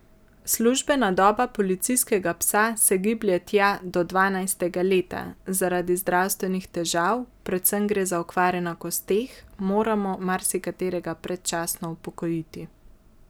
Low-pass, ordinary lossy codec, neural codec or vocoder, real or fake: none; none; none; real